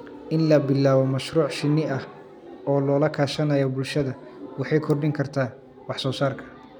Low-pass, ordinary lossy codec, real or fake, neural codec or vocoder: 19.8 kHz; none; real; none